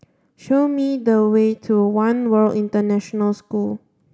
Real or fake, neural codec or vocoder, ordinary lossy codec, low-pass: real; none; none; none